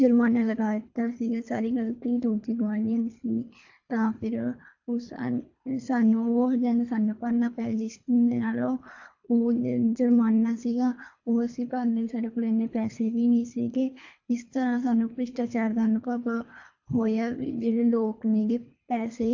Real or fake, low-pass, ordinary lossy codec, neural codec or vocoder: fake; 7.2 kHz; AAC, 48 kbps; codec, 24 kHz, 3 kbps, HILCodec